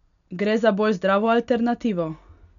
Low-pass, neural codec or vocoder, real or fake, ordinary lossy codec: 7.2 kHz; none; real; none